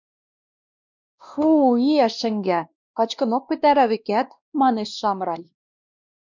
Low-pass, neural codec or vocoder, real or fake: 7.2 kHz; codec, 16 kHz, 1 kbps, X-Codec, WavLM features, trained on Multilingual LibriSpeech; fake